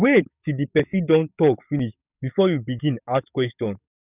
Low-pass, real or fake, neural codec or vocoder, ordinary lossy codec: 3.6 kHz; fake; codec, 44.1 kHz, 7.8 kbps, DAC; none